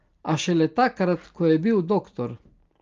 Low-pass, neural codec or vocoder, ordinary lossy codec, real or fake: 7.2 kHz; none; Opus, 32 kbps; real